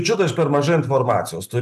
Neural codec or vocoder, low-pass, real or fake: none; 14.4 kHz; real